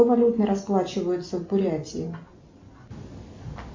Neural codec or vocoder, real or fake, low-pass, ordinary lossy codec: none; real; 7.2 kHz; MP3, 64 kbps